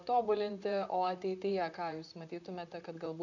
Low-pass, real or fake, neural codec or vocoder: 7.2 kHz; fake; vocoder, 44.1 kHz, 128 mel bands, Pupu-Vocoder